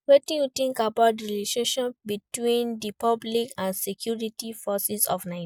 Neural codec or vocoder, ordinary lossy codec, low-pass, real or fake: none; none; 19.8 kHz; real